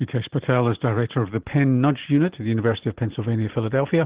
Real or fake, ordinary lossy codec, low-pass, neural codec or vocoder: real; Opus, 16 kbps; 3.6 kHz; none